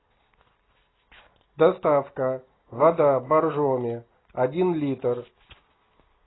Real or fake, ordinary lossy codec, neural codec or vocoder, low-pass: real; AAC, 16 kbps; none; 7.2 kHz